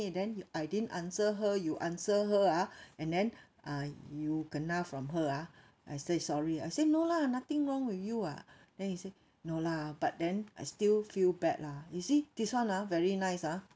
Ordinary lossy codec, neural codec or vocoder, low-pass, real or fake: none; none; none; real